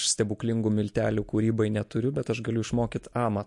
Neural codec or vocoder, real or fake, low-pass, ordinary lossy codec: none; real; 10.8 kHz; MP3, 48 kbps